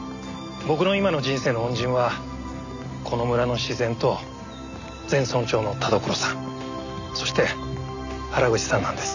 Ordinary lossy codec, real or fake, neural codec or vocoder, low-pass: none; real; none; 7.2 kHz